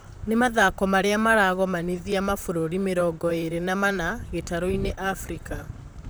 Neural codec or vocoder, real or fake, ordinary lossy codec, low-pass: vocoder, 44.1 kHz, 128 mel bands, Pupu-Vocoder; fake; none; none